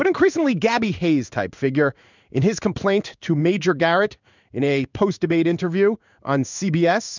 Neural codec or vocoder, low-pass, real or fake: codec, 16 kHz in and 24 kHz out, 1 kbps, XY-Tokenizer; 7.2 kHz; fake